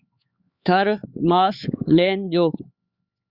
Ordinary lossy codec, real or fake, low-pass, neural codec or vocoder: Opus, 64 kbps; fake; 5.4 kHz; codec, 16 kHz, 4 kbps, X-Codec, HuBERT features, trained on LibriSpeech